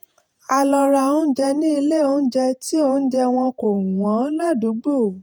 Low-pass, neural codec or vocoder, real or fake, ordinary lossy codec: none; vocoder, 48 kHz, 128 mel bands, Vocos; fake; none